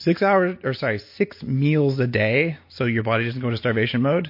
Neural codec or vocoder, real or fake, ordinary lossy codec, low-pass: none; real; MP3, 32 kbps; 5.4 kHz